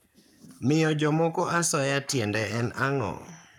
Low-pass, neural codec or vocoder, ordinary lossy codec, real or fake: 19.8 kHz; codec, 44.1 kHz, 7.8 kbps, Pupu-Codec; none; fake